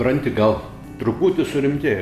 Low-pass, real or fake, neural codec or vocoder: 14.4 kHz; real; none